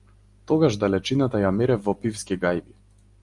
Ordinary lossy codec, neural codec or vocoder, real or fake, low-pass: Opus, 32 kbps; none; real; 10.8 kHz